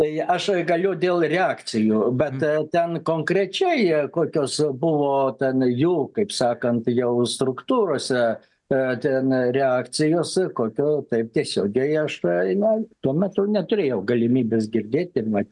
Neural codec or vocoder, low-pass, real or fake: none; 10.8 kHz; real